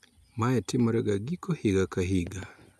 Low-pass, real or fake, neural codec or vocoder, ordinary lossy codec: 14.4 kHz; real; none; none